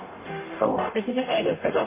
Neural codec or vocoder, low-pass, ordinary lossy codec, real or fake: codec, 44.1 kHz, 0.9 kbps, DAC; 3.6 kHz; MP3, 16 kbps; fake